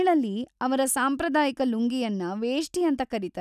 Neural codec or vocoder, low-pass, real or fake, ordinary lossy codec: none; 14.4 kHz; real; none